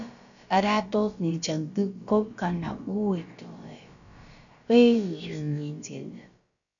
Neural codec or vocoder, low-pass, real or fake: codec, 16 kHz, about 1 kbps, DyCAST, with the encoder's durations; 7.2 kHz; fake